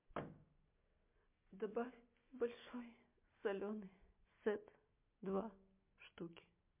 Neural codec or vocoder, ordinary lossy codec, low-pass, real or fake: none; MP3, 24 kbps; 3.6 kHz; real